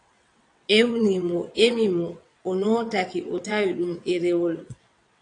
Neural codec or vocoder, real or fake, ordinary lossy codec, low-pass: vocoder, 22.05 kHz, 80 mel bands, WaveNeXt; fake; AAC, 48 kbps; 9.9 kHz